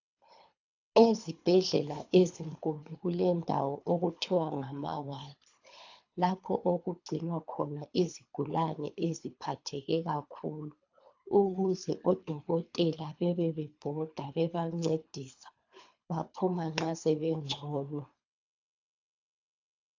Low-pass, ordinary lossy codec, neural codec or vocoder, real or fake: 7.2 kHz; AAC, 48 kbps; codec, 24 kHz, 3 kbps, HILCodec; fake